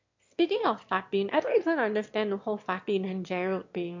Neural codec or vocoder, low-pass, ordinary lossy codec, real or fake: autoencoder, 22.05 kHz, a latent of 192 numbers a frame, VITS, trained on one speaker; 7.2 kHz; MP3, 48 kbps; fake